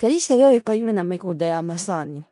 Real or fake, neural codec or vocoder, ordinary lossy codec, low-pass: fake; codec, 16 kHz in and 24 kHz out, 0.4 kbps, LongCat-Audio-Codec, four codebook decoder; none; 10.8 kHz